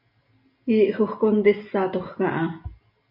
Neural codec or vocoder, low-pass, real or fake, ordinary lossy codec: none; 5.4 kHz; real; MP3, 48 kbps